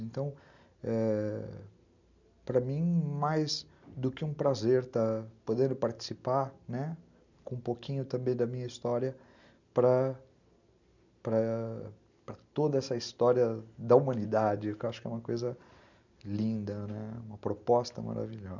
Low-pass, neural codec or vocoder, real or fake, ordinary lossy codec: 7.2 kHz; none; real; none